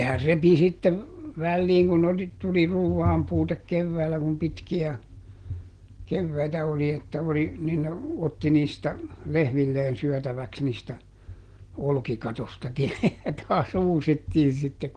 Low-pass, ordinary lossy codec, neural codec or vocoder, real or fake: 9.9 kHz; Opus, 16 kbps; none; real